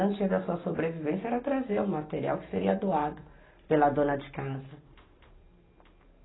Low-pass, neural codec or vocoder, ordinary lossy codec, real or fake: 7.2 kHz; none; AAC, 16 kbps; real